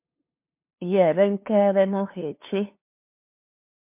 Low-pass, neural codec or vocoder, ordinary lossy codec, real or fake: 3.6 kHz; codec, 16 kHz, 2 kbps, FunCodec, trained on LibriTTS, 25 frames a second; MP3, 32 kbps; fake